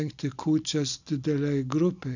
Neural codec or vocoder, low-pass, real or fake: none; 7.2 kHz; real